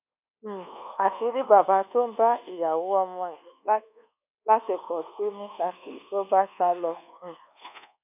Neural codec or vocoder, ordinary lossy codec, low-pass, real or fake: codec, 24 kHz, 1.2 kbps, DualCodec; none; 3.6 kHz; fake